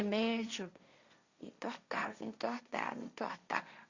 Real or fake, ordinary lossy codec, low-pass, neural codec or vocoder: fake; Opus, 64 kbps; 7.2 kHz; codec, 16 kHz, 1.1 kbps, Voila-Tokenizer